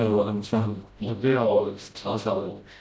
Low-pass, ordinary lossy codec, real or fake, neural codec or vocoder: none; none; fake; codec, 16 kHz, 0.5 kbps, FreqCodec, smaller model